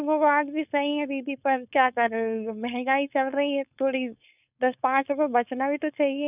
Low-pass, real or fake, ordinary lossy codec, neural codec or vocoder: 3.6 kHz; fake; none; codec, 16 kHz, 4.8 kbps, FACodec